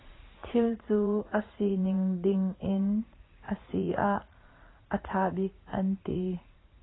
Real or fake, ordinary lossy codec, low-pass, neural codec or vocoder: fake; AAC, 16 kbps; 7.2 kHz; vocoder, 44.1 kHz, 80 mel bands, Vocos